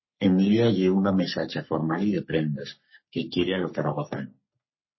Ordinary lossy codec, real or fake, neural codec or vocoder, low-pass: MP3, 24 kbps; fake; codec, 44.1 kHz, 3.4 kbps, Pupu-Codec; 7.2 kHz